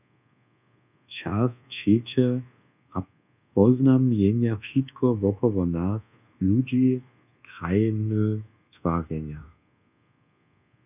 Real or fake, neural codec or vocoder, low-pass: fake; codec, 24 kHz, 1.2 kbps, DualCodec; 3.6 kHz